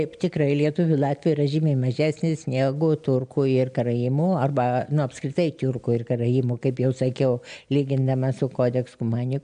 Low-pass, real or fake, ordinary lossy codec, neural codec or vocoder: 9.9 kHz; real; MP3, 96 kbps; none